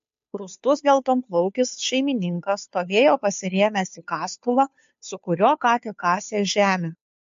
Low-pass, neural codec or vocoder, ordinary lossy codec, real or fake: 7.2 kHz; codec, 16 kHz, 2 kbps, FunCodec, trained on Chinese and English, 25 frames a second; MP3, 48 kbps; fake